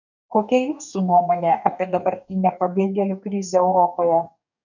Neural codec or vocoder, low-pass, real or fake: codec, 44.1 kHz, 2.6 kbps, DAC; 7.2 kHz; fake